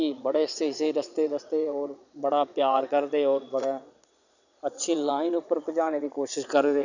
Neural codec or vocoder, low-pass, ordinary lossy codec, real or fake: vocoder, 22.05 kHz, 80 mel bands, Vocos; 7.2 kHz; none; fake